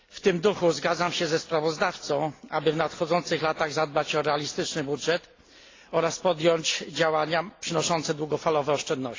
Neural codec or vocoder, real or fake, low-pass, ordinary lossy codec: none; real; 7.2 kHz; AAC, 32 kbps